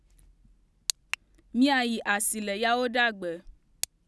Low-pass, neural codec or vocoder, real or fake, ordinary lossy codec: none; none; real; none